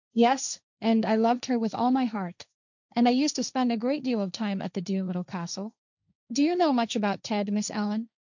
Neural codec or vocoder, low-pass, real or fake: codec, 16 kHz, 1.1 kbps, Voila-Tokenizer; 7.2 kHz; fake